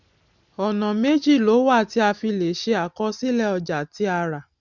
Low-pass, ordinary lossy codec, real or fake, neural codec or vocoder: 7.2 kHz; none; real; none